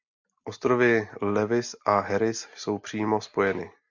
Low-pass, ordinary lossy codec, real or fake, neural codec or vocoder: 7.2 kHz; MP3, 64 kbps; real; none